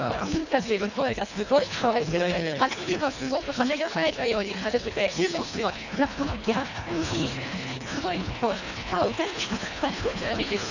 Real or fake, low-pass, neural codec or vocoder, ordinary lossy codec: fake; 7.2 kHz; codec, 24 kHz, 1.5 kbps, HILCodec; none